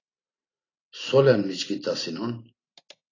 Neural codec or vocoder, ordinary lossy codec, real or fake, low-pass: vocoder, 44.1 kHz, 128 mel bands every 512 samples, BigVGAN v2; AAC, 32 kbps; fake; 7.2 kHz